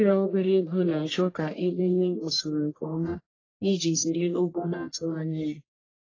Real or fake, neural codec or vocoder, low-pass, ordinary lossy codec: fake; codec, 44.1 kHz, 1.7 kbps, Pupu-Codec; 7.2 kHz; AAC, 32 kbps